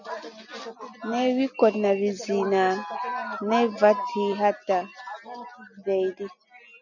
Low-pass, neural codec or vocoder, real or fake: 7.2 kHz; none; real